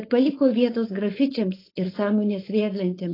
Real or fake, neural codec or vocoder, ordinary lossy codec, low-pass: fake; codec, 16 kHz, 4.8 kbps, FACodec; AAC, 24 kbps; 5.4 kHz